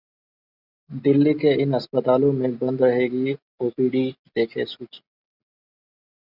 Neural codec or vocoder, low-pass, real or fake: none; 5.4 kHz; real